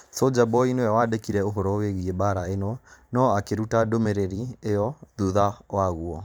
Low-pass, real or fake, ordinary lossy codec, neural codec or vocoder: none; fake; none; vocoder, 44.1 kHz, 128 mel bands every 512 samples, BigVGAN v2